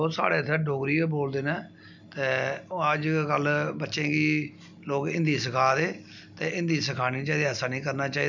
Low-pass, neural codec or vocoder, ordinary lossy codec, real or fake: 7.2 kHz; none; none; real